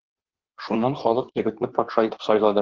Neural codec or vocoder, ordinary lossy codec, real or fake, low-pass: codec, 16 kHz in and 24 kHz out, 1.1 kbps, FireRedTTS-2 codec; Opus, 16 kbps; fake; 7.2 kHz